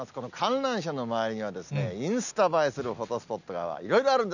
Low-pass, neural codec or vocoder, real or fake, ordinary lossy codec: 7.2 kHz; none; real; none